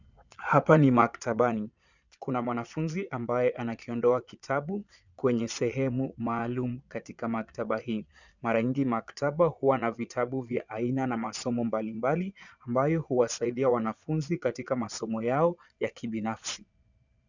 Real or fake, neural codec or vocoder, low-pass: fake; vocoder, 22.05 kHz, 80 mel bands, Vocos; 7.2 kHz